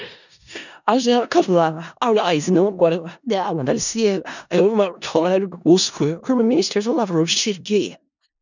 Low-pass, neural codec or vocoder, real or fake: 7.2 kHz; codec, 16 kHz in and 24 kHz out, 0.4 kbps, LongCat-Audio-Codec, four codebook decoder; fake